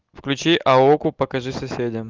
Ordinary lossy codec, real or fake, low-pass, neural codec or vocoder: Opus, 24 kbps; real; 7.2 kHz; none